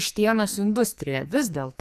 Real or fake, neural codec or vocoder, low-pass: fake; codec, 44.1 kHz, 2.6 kbps, SNAC; 14.4 kHz